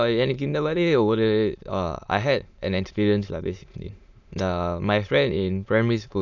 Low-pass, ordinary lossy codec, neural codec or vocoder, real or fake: 7.2 kHz; none; autoencoder, 22.05 kHz, a latent of 192 numbers a frame, VITS, trained on many speakers; fake